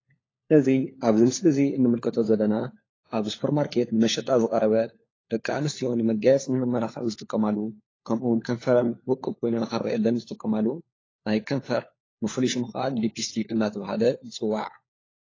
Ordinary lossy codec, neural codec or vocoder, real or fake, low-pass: AAC, 32 kbps; codec, 16 kHz, 4 kbps, FunCodec, trained on LibriTTS, 50 frames a second; fake; 7.2 kHz